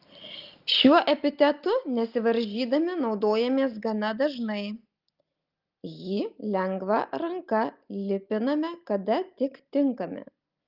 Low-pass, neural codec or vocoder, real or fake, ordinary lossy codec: 5.4 kHz; none; real; Opus, 32 kbps